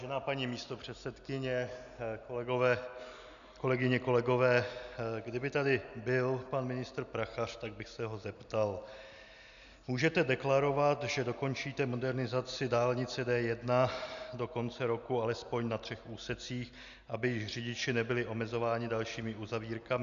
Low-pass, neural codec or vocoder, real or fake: 7.2 kHz; none; real